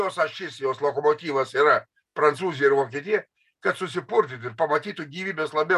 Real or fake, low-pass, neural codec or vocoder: real; 14.4 kHz; none